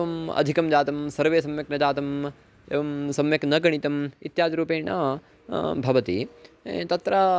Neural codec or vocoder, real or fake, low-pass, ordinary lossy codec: none; real; none; none